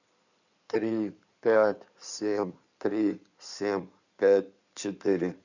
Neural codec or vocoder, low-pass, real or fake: codec, 16 kHz in and 24 kHz out, 2.2 kbps, FireRedTTS-2 codec; 7.2 kHz; fake